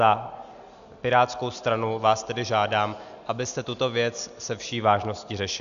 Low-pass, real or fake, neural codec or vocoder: 7.2 kHz; real; none